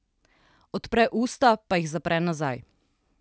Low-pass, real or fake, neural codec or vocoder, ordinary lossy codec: none; real; none; none